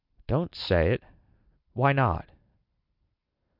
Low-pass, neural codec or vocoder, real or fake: 5.4 kHz; none; real